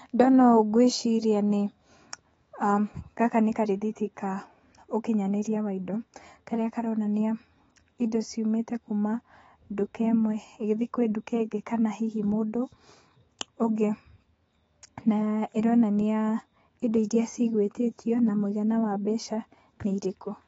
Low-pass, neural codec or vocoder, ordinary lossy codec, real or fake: 19.8 kHz; autoencoder, 48 kHz, 128 numbers a frame, DAC-VAE, trained on Japanese speech; AAC, 24 kbps; fake